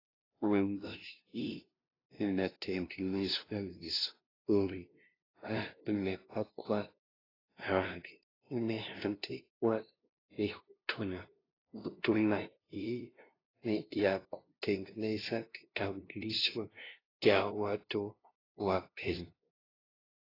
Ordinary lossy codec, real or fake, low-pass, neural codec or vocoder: AAC, 24 kbps; fake; 5.4 kHz; codec, 16 kHz, 0.5 kbps, FunCodec, trained on LibriTTS, 25 frames a second